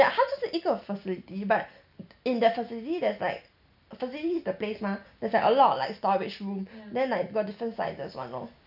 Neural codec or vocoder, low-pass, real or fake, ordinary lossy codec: none; 5.4 kHz; real; none